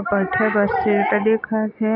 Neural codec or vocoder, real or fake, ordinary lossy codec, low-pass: none; real; none; 5.4 kHz